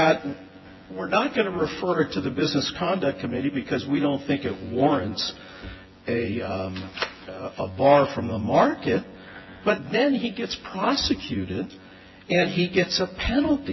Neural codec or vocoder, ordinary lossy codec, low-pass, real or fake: vocoder, 24 kHz, 100 mel bands, Vocos; MP3, 24 kbps; 7.2 kHz; fake